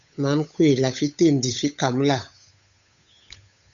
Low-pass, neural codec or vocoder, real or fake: 7.2 kHz; codec, 16 kHz, 8 kbps, FunCodec, trained on Chinese and English, 25 frames a second; fake